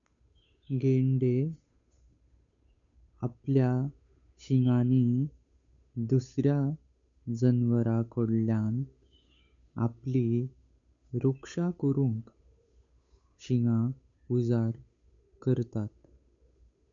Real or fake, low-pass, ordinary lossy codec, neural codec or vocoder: fake; 7.2 kHz; none; codec, 16 kHz, 8 kbps, FunCodec, trained on Chinese and English, 25 frames a second